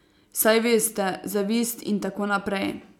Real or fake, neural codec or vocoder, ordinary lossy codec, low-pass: real; none; none; 19.8 kHz